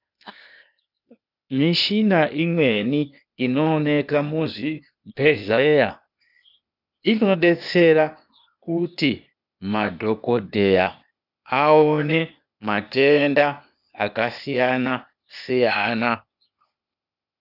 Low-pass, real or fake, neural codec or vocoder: 5.4 kHz; fake; codec, 16 kHz, 0.8 kbps, ZipCodec